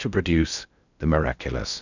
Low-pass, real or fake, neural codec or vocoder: 7.2 kHz; fake; codec, 16 kHz in and 24 kHz out, 0.6 kbps, FocalCodec, streaming, 4096 codes